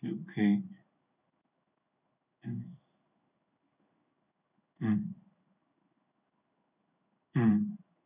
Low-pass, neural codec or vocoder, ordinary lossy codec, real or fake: 3.6 kHz; codec, 16 kHz in and 24 kHz out, 1 kbps, XY-Tokenizer; none; fake